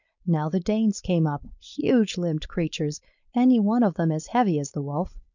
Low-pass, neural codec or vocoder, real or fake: 7.2 kHz; codec, 16 kHz, 4.8 kbps, FACodec; fake